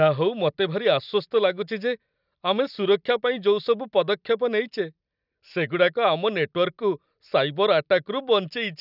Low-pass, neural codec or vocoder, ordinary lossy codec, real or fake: 5.4 kHz; none; none; real